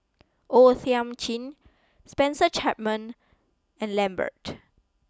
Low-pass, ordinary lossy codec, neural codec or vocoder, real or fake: none; none; none; real